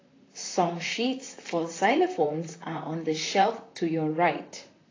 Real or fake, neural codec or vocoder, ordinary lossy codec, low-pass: fake; vocoder, 44.1 kHz, 128 mel bands, Pupu-Vocoder; AAC, 32 kbps; 7.2 kHz